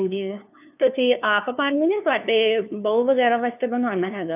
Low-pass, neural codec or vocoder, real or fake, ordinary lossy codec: 3.6 kHz; codec, 16 kHz, 2 kbps, FunCodec, trained on LibriTTS, 25 frames a second; fake; none